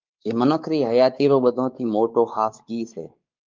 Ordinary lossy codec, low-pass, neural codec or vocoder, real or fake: Opus, 32 kbps; 7.2 kHz; codec, 16 kHz, 4 kbps, X-Codec, WavLM features, trained on Multilingual LibriSpeech; fake